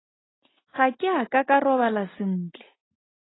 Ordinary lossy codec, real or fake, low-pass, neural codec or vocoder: AAC, 16 kbps; real; 7.2 kHz; none